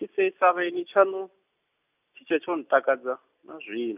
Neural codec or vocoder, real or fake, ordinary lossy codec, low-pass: none; real; none; 3.6 kHz